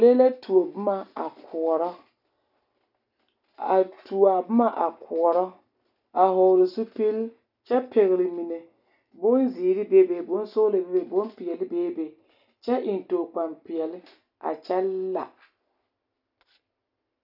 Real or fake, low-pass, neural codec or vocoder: real; 5.4 kHz; none